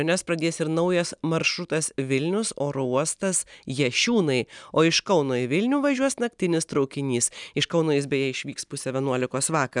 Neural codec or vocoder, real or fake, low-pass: none; real; 10.8 kHz